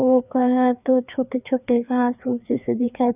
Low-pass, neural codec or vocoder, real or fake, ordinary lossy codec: 3.6 kHz; codec, 16 kHz, 2 kbps, FreqCodec, larger model; fake; none